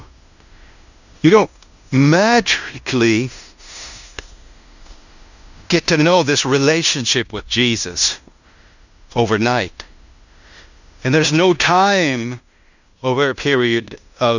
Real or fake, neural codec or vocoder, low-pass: fake; codec, 16 kHz in and 24 kHz out, 0.9 kbps, LongCat-Audio-Codec, fine tuned four codebook decoder; 7.2 kHz